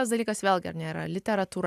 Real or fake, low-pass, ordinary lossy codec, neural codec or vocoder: real; 14.4 kHz; AAC, 96 kbps; none